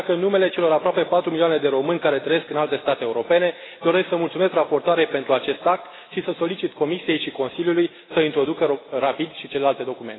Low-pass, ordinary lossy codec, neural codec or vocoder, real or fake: 7.2 kHz; AAC, 16 kbps; none; real